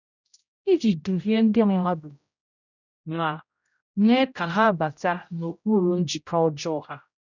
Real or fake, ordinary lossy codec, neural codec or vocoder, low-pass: fake; none; codec, 16 kHz, 0.5 kbps, X-Codec, HuBERT features, trained on general audio; 7.2 kHz